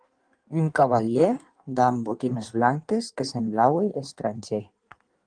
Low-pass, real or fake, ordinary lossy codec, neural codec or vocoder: 9.9 kHz; fake; Opus, 24 kbps; codec, 16 kHz in and 24 kHz out, 1.1 kbps, FireRedTTS-2 codec